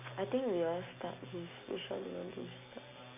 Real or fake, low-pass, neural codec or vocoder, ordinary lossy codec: real; 3.6 kHz; none; none